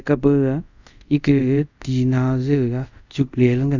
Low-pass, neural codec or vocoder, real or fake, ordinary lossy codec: 7.2 kHz; codec, 24 kHz, 0.5 kbps, DualCodec; fake; none